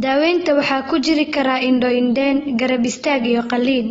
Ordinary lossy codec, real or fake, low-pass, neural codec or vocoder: AAC, 24 kbps; real; 19.8 kHz; none